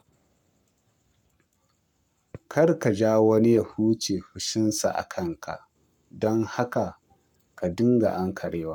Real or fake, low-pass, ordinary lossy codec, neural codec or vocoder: fake; 19.8 kHz; none; codec, 44.1 kHz, 7.8 kbps, Pupu-Codec